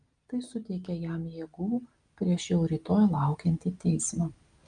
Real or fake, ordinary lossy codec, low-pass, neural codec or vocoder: real; Opus, 32 kbps; 9.9 kHz; none